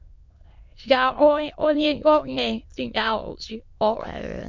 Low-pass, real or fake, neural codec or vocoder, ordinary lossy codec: 7.2 kHz; fake; autoencoder, 22.05 kHz, a latent of 192 numbers a frame, VITS, trained on many speakers; MP3, 48 kbps